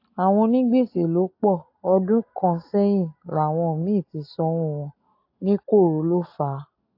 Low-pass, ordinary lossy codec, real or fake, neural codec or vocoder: 5.4 kHz; AAC, 32 kbps; real; none